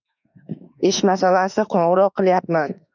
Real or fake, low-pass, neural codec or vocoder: fake; 7.2 kHz; autoencoder, 48 kHz, 32 numbers a frame, DAC-VAE, trained on Japanese speech